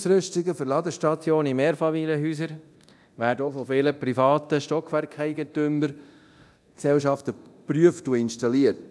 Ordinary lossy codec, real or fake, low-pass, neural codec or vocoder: none; fake; none; codec, 24 kHz, 0.9 kbps, DualCodec